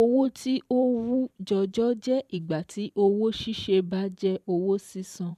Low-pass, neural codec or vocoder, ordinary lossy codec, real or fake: 14.4 kHz; vocoder, 44.1 kHz, 128 mel bands every 512 samples, BigVGAN v2; none; fake